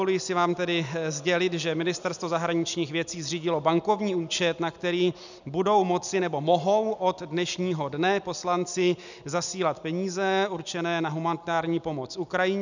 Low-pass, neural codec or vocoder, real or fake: 7.2 kHz; none; real